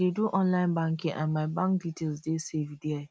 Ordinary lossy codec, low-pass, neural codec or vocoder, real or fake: none; none; none; real